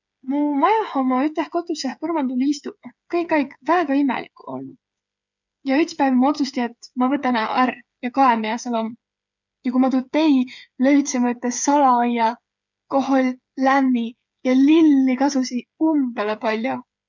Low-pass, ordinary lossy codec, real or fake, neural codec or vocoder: 7.2 kHz; none; fake; codec, 16 kHz, 8 kbps, FreqCodec, smaller model